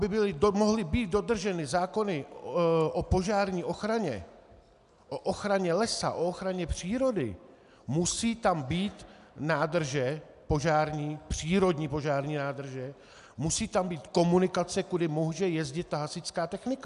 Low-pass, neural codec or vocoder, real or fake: 10.8 kHz; none; real